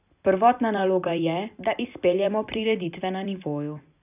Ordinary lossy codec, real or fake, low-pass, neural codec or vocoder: none; fake; 3.6 kHz; vocoder, 44.1 kHz, 128 mel bands every 256 samples, BigVGAN v2